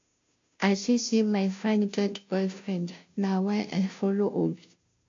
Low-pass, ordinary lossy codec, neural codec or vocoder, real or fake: 7.2 kHz; AAC, 48 kbps; codec, 16 kHz, 0.5 kbps, FunCodec, trained on Chinese and English, 25 frames a second; fake